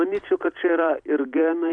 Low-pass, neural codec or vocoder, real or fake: 9.9 kHz; vocoder, 44.1 kHz, 128 mel bands every 256 samples, BigVGAN v2; fake